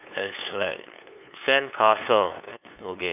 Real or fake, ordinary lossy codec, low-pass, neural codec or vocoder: fake; none; 3.6 kHz; codec, 16 kHz, 2 kbps, FunCodec, trained on LibriTTS, 25 frames a second